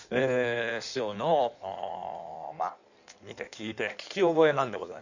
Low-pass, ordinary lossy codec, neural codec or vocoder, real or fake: 7.2 kHz; none; codec, 16 kHz in and 24 kHz out, 1.1 kbps, FireRedTTS-2 codec; fake